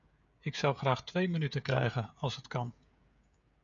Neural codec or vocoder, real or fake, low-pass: codec, 16 kHz, 16 kbps, FreqCodec, smaller model; fake; 7.2 kHz